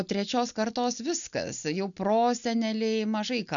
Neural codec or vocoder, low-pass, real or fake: none; 7.2 kHz; real